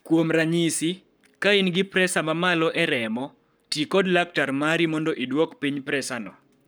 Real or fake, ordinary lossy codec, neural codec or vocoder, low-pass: fake; none; codec, 44.1 kHz, 7.8 kbps, Pupu-Codec; none